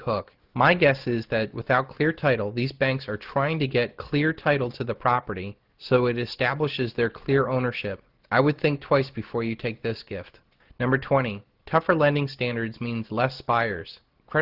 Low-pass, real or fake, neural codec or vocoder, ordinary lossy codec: 5.4 kHz; real; none; Opus, 16 kbps